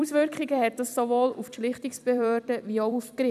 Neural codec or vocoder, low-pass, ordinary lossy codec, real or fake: vocoder, 44.1 kHz, 128 mel bands every 256 samples, BigVGAN v2; 14.4 kHz; none; fake